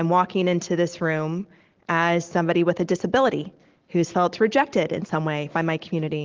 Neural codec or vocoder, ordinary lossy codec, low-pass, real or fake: none; Opus, 16 kbps; 7.2 kHz; real